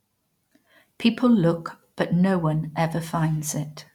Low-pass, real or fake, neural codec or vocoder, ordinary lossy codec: 19.8 kHz; real; none; none